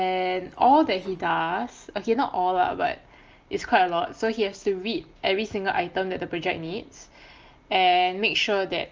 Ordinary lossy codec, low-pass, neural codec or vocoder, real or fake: Opus, 24 kbps; 7.2 kHz; none; real